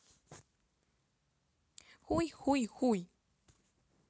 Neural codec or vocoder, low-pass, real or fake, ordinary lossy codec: none; none; real; none